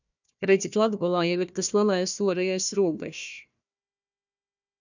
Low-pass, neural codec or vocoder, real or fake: 7.2 kHz; codec, 16 kHz, 1 kbps, FunCodec, trained on Chinese and English, 50 frames a second; fake